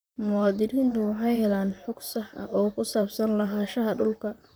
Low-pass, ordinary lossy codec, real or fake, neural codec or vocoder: none; none; fake; vocoder, 44.1 kHz, 128 mel bands, Pupu-Vocoder